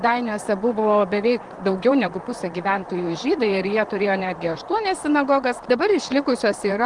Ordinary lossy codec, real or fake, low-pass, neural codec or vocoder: Opus, 24 kbps; fake; 10.8 kHz; vocoder, 44.1 kHz, 128 mel bands, Pupu-Vocoder